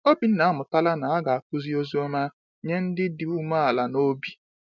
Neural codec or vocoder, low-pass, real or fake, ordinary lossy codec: none; 7.2 kHz; real; none